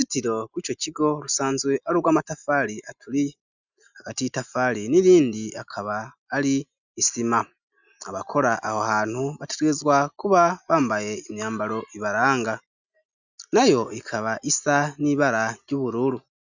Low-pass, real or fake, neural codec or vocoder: 7.2 kHz; real; none